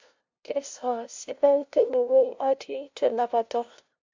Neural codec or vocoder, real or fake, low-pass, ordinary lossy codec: codec, 16 kHz, 0.5 kbps, FunCodec, trained on LibriTTS, 25 frames a second; fake; 7.2 kHz; MP3, 48 kbps